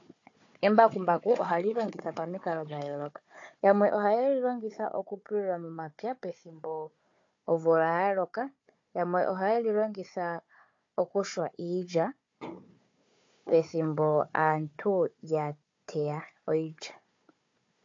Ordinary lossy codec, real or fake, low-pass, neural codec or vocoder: AAC, 48 kbps; fake; 7.2 kHz; codec, 16 kHz, 4 kbps, FunCodec, trained on Chinese and English, 50 frames a second